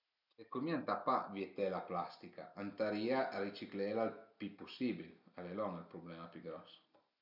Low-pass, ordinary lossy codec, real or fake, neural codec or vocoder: 5.4 kHz; none; real; none